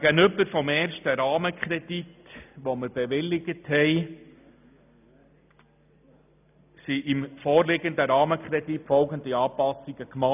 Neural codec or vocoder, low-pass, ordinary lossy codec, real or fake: none; 3.6 kHz; none; real